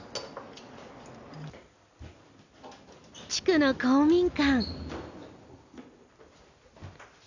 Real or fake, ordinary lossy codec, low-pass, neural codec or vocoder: real; none; 7.2 kHz; none